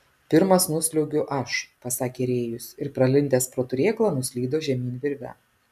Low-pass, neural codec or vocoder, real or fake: 14.4 kHz; none; real